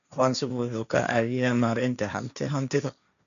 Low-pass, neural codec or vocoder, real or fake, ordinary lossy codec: 7.2 kHz; codec, 16 kHz, 1.1 kbps, Voila-Tokenizer; fake; none